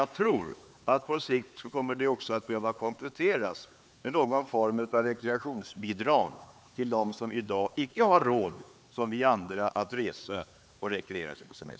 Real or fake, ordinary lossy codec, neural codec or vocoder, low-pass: fake; none; codec, 16 kHz, 4 kbps, X-Codec, HuBERT features, trained on LibriSpeech; none